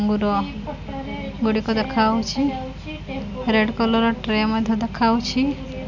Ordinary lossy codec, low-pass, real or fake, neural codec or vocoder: none; 7.2 kHz; real; none